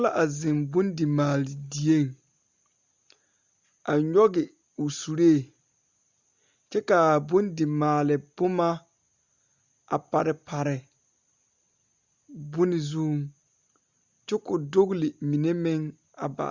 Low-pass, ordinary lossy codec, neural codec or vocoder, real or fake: 7.2 kHz; Opus, 64 kbps; none; real